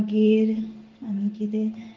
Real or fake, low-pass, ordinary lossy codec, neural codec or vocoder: fake; 7.2 kHz; Opus, 16 kbps; codec, 16 kHz in and 24 kHz out, 1 kbps, XY-Tokenizer